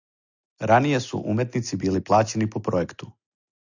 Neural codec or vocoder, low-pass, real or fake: none; 7.2 kHz; real